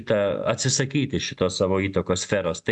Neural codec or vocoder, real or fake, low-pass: none; real; 10.8 kHz